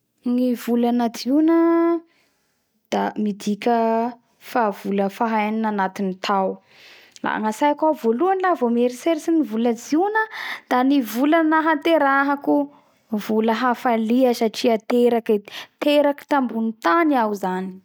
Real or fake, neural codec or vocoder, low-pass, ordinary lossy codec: real; none; none; none